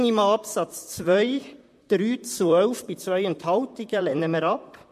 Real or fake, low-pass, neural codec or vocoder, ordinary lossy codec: fake; 14.4 kHz; vocoder, 44.1 kHz, 128 mel bands, Pupu-Vocoder; MP3, 64 kbps